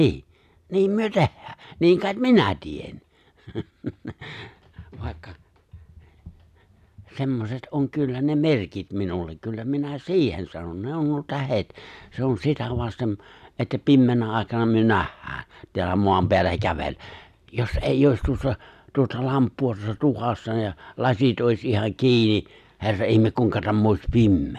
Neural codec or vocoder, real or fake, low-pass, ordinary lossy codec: none; real; 14.4 kHz; none